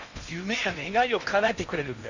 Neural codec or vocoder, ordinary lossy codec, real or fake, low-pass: codec, 16 kHz in and 24 kHz out, 0.8 kbps, FocalCodec, streaming, 65536 codes; none; fake; 7.2 kHz